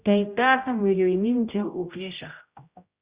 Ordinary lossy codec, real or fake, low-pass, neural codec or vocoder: Opus, 64 kbps; fake; 3.6 kHz; codec, 16 kHz, 0.5 kbps, X-Codec, HuBERT features, trained on general audio